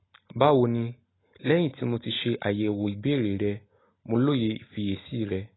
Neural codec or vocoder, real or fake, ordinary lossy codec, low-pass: none; real; AAC, 16 kbps; 7.2 kHz